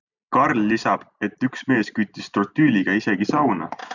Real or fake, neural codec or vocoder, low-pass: real; none; 7.2 kHz